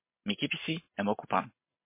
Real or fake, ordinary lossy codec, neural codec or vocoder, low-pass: real; MP3, 24 kbps; none; 3.6 kHz